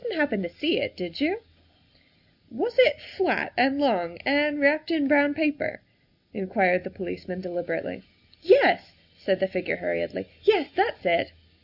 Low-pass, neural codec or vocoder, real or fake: 5.4 kHz; none; real